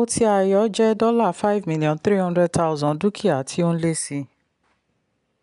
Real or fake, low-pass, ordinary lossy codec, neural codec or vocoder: real; 10.8 kHz; none; none